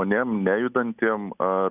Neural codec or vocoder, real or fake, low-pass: none; real; 3.6 kHz